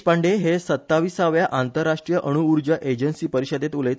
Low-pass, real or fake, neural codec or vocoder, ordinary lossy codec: none; real; none; none